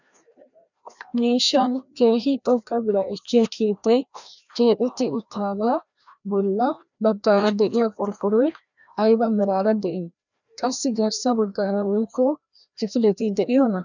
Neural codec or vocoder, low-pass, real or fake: codec, 16 kHz, 1 kbps, FreqCodec, larger model; 7.2 kHz; fake